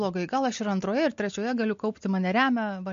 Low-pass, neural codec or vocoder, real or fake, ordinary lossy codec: 7.2 kHz; none; real; MP3, 48 kbps